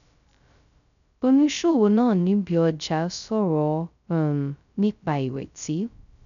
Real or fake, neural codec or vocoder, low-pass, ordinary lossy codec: fake; codec, 16 kHz, 0.2 kbps, FocalCodec; 7.2 kHz; none